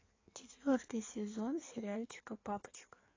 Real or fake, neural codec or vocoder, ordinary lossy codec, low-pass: fake; codec, 16 kHz in and 24 kHz out, 1.1 kbps, FireRedTTS-2 codec; AAC, 32 kbps; 7.2 kHz